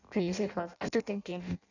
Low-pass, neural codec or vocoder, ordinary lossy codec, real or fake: 7.2 kHz; codec, 16 kHz in and 24 kHz out, 0.6 kbps, FireRedTTS-2 codec; none; fake